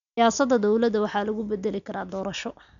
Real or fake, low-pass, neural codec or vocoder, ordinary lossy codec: real; 7.2 kHz; none; none